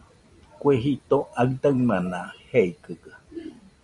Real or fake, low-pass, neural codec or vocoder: fake; 10.8 kHz; vocoder, 44.1 kHz, 128 mel bands every 256 samples, BigVGAN v2